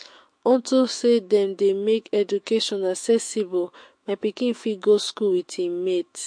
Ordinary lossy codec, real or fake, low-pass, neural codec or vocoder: MP3, 48 kbps; fake; 9.9 kHz; autoencoder, 48 kHz, 128 numbers a frame, DAC-VAE, trained on Japanese speech